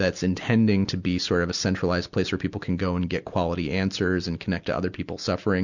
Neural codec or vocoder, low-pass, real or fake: none; 7.2 kHz; real